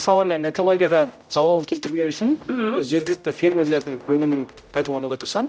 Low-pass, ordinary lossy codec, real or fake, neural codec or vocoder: none; none; fake; codec, 16 kHz, 0.5 kbps, X-Codec, HuBERT features, trained on general audio